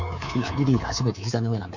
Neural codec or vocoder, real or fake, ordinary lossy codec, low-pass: codec, 24 kHz, 3.1 kbps, DualCodec; fake; none; 7.2 kHz